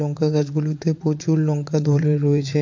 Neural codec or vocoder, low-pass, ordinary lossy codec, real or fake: vocoder, 44.1 kHz, 80 mel bands, Vocos; 7.2 kHz; MP3, 48 kbps; fake